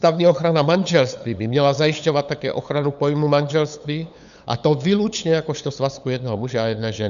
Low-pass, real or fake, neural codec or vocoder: 7.2 kHz; fake; codec, 16 kHz, 8 kbps, FunCodec, trained on LibriTTS, 25 frames a second